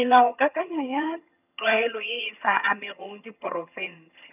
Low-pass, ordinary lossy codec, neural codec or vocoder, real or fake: 3.6 kHz; none; vocoder, 22.05 kHz, 80 mel bands, HiFi-GAN; fake